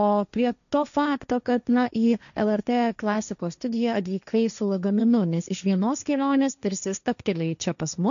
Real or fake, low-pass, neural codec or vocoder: fake; 7.2 kHz; codec, 16 kHz, 1.1 kbps, Voila-Tokenizer